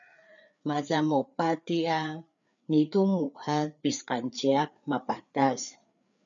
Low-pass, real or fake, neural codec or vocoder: 7.2 kHz; fake; codec, 16 kHz, 4 kbps, FreqCodec, larger model